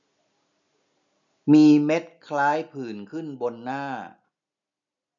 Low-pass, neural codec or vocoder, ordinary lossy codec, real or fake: 7.2 kHz; none; none; real